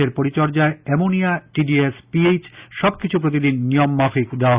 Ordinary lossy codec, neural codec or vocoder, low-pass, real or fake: Opus, 64 kbps; none; 3.6 kHz; real